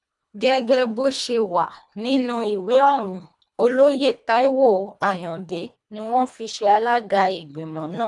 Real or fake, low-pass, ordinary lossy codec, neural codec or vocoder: fake; 10.8 kHz; none; codec, 24 kHz, 1.5 kbps, HILCodec